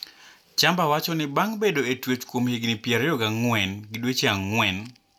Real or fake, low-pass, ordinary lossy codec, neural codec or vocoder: real; 19.8 kHz; none; none